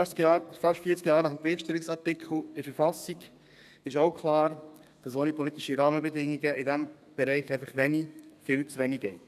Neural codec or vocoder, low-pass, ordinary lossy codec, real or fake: codec, 32 kHz, 1.9 kbps, SNAC; 14.4 kHz; none; fake